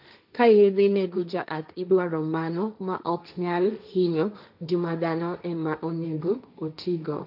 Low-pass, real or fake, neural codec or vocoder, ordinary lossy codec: 5.4 kHz; fake; codec, 16 kHz, 1.1 kbps, Voila-Tokenizer; none